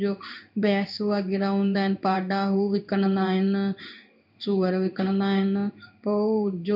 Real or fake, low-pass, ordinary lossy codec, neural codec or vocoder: fake; 5.4 kHz; none; codec, 16 kHz in and 24 kHz out, 1 kbps, XY-Tokenizer